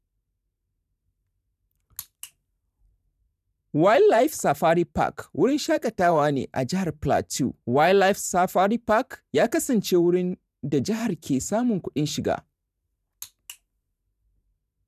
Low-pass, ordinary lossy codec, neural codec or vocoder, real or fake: 14.4 kHz; none; vocoder, 44.1 kHz, 128 mel bands every 512 samples, BigVGAN v2; fake